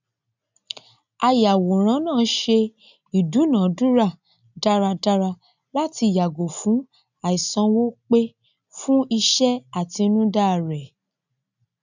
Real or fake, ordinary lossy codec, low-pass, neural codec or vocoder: real; none; 7.2 kHz; none